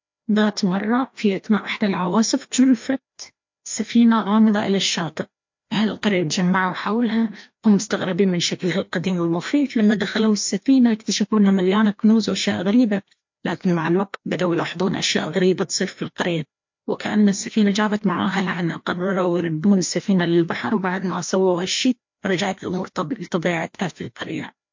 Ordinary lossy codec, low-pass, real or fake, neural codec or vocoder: MP3, 48 kbps; 7.2 kHz; fake; codec, 16 kHz, 1 kbps, FreqCodec, larger model